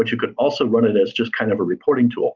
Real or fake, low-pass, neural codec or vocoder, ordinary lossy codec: real; 7.2 kHz; none; Opus, 32 kbps